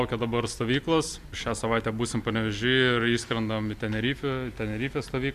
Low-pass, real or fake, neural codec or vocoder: 14.4 kHz; real; none